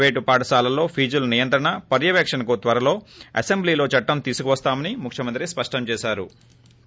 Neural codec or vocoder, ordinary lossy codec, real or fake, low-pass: none; none; real; none